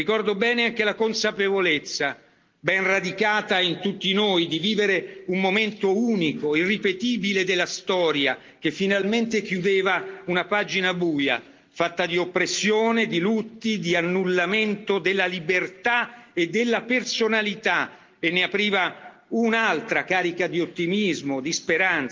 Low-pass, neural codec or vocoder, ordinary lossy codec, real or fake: 7.2 kHz; none; Opus, 32 kbps; real